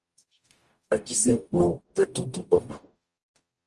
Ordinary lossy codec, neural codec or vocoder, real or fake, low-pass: Opus, 32 kbps; codec, 44.1 kHz, 0.9 kbps, DAC; fake; 10.8 kHz